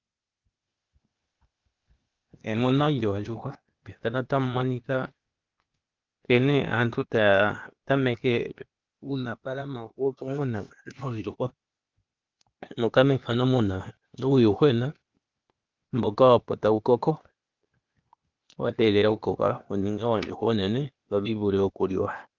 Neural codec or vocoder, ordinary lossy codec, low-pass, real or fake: codec, 16 kHz, 0.8 kbps, ZipCodec; Opus, 32 kbps; 7.2 kHz; fake